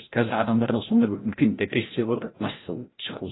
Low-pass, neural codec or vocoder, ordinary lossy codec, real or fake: 7.2 kHz; codec, 16 kHz, 0.5 kbps, FreqCodec, larger model; AAC, 16 kbps; fake